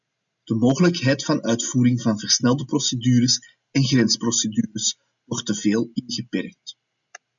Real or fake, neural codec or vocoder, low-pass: real; none; 7.2 kHz